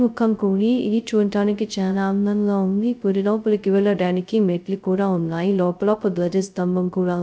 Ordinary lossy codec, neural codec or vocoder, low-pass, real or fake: none; codec, 16 kHz, 0.2 kbps, FocalCodec; none; fake